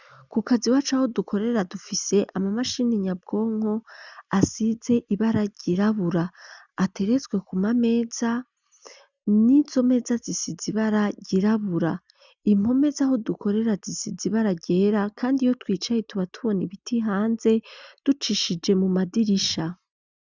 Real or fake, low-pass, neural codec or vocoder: real; 7.2 kHz; none